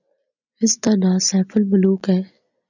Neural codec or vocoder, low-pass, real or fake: none; 7.2 kHz; real